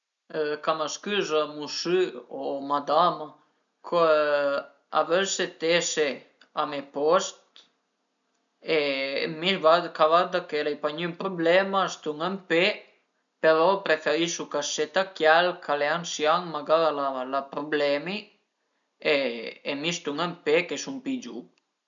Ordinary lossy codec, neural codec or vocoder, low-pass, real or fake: none; none; 7.2 kHz; real